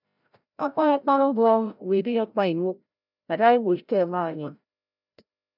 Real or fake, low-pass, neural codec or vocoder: fake; 5.4 kHz; codec, 16 kHz, 0.5 kbps, FreqCodec, larger model